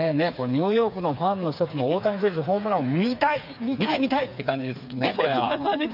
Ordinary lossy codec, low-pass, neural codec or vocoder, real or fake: none; 5.4 kHz; codec, 16 kHz, 4 kbps, FreqCodec, smaller model; fake